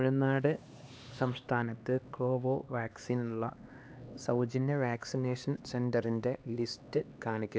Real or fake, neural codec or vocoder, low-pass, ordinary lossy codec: fake; codec, 16 kHz, 2 kbps, X-Codec, HuBERT features, trained on LibriSpeech; none; none